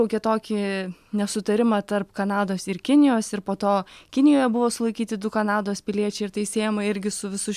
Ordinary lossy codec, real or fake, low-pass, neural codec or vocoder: MP3, 96 kbps; real; 14.4 kHz; none